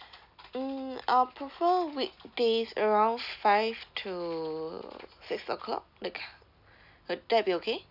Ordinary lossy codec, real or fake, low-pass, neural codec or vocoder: none; real; 5.4 kHz; none